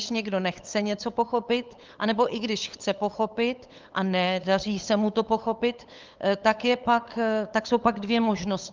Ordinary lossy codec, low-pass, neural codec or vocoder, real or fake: Opus, 32 kbps; 7.2 kHz; codec, 16 kHz, 8 kbps, FunCodec, trained on LibriTTS, 25 frames a second; fake